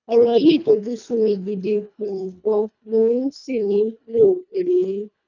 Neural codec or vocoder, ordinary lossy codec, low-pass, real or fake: codec, 24 kHz, 1.5 kbps, HILCodec; none; 7.2 kHz; fake